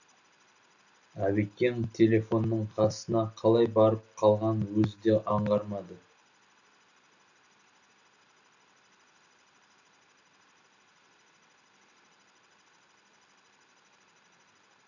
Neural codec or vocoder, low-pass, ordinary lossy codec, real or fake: none; 7.2 kHz; none; real